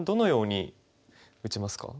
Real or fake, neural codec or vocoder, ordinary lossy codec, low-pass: real; none; none; none